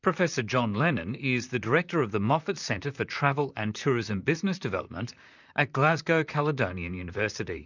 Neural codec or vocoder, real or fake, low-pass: vocoder, 44.1 kHz, 128 mel bands, Pupu-Vocoder; fake; 7.2 kHz